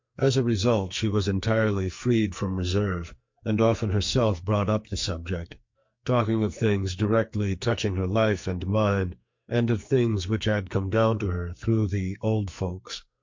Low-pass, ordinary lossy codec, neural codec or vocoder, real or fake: 7.2 kHz; MP3, 48 kbps; codec, 44.1 kHz, 2.6 kbps, SNAC; fake